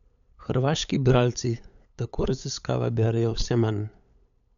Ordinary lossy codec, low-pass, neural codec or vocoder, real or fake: none; 7.2 kHz; codec, 16 kHz, 8 kbps, FunCodec, trained on LibriTTS, 25 frames a second; fake